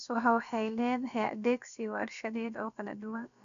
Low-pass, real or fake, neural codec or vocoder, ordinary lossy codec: 7.2 kHz; fake; codec, 16 kHz, about 1 kbps, DyCAST, with the encoder's durations; none